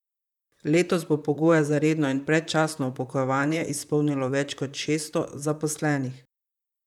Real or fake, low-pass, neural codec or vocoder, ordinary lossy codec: fake; 19.8 kHz; vocoder, 44.1 kHz, 128 mel bands, Pupu-Vocoder; none